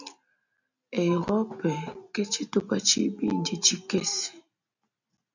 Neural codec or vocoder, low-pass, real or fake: none; 7.2 kHz; real